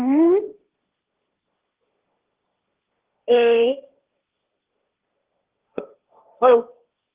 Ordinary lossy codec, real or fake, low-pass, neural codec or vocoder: Opus, 16 kbps; fake; 3.6 kHz; codec, 16 kHz, 1.1 kbps, Voila-Tokenizer